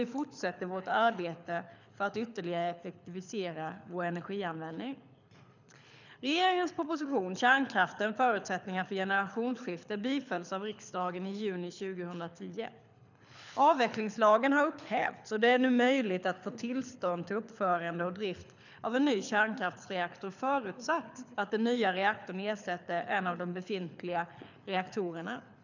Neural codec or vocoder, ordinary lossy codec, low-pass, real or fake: codec, 24 kHz, 6 kbps, HILCodec; none; 7.2 kHz; fake